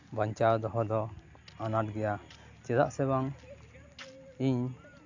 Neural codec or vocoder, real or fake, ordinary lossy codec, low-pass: none; real; AAC, 48 kbps; 7.2 kHz